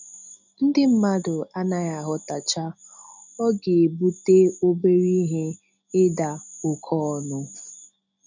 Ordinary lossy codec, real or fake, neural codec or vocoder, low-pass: none; real; none; 7.2 kHz